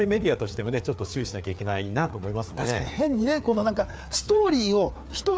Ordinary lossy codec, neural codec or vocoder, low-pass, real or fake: none; codec, 16 kHz, 4 kbps, FreqCodec, larger model; none; fake